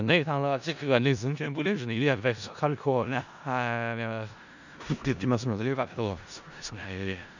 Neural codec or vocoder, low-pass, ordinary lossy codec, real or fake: codec, 16 kHz in and 24 kHz out, 0.4 kbps, LongCat-Audio-Codec, four codebook decoder; 7.2 kHz; none; fake